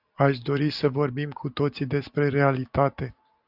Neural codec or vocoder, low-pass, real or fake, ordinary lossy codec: none; 5.4 kHz; real; Opus, 64 kbps